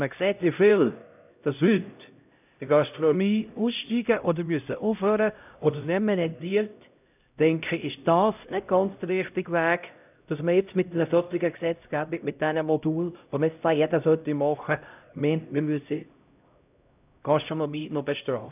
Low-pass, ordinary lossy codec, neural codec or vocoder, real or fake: 3.6 kHz; none; codec, 16 kHz, 0.5 kbps, X-Codec, HuBERT features, trained on LibriSpeech; fake